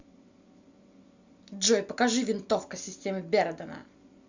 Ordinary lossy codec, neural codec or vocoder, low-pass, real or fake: Opus, 64 kbps; none; 7.2 kHz; real